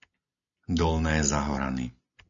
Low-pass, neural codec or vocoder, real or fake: 7.2 kHz; none; real